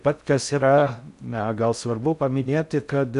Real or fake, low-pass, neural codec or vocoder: fake; 10.8 kHz; codec, 16 kHz in and 24 kHz out, 0.6 kbps, FocalCodec, streaming, 4096 codes